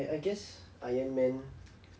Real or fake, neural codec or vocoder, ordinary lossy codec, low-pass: real; none; none; none